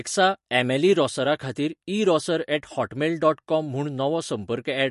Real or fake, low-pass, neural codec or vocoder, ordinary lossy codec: real; 14.4 kHz; none; MP3, 48 kbps